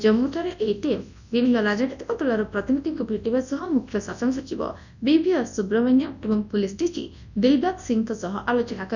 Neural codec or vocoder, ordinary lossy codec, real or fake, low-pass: codec, 24 kHz, 0.9 kbps, WavTokenizer, large speech release; none; fake; 7.2 kHz